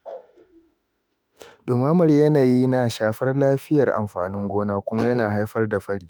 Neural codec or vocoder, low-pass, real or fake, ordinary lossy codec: autoencoder, 48 kHz, 32 numbers a frame, DAC-VAE, trained on Japanese speech; none; fake; none